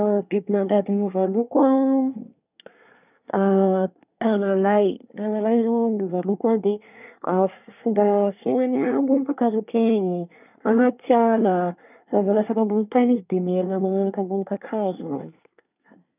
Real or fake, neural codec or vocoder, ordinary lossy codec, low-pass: fake; codec, 24 kHz, 1 kbps, SNAC; none; 3.6 kHz